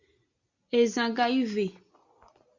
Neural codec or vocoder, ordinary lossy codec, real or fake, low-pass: none; Opus, 64 kbps; real; 7.2 kHz